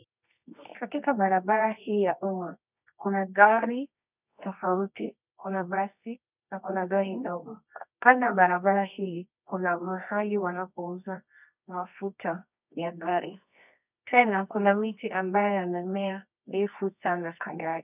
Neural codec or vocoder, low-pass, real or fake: codec, 24 kHz, 0.9 kbps, WavTokenizer, medium music audio release; 3.6 kHz; fake